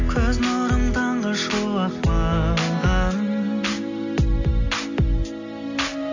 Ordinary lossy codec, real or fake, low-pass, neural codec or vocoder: AAC, 48 kbps; real; 7.2 kHz; none